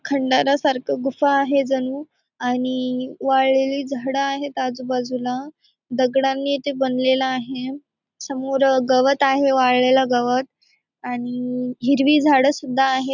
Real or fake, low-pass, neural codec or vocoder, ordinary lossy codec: real; 7.2 kHz; none; none